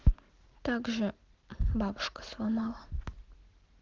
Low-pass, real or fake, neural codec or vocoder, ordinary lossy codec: 7.2 kHz; real; none; Opus, 16 kbps